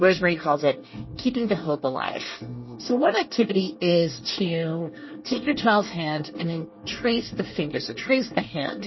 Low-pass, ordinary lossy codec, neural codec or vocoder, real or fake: 7.2 kHz; MP3, 24 kbps; codec, 24 kHz, 1 kbps, SNAC; fake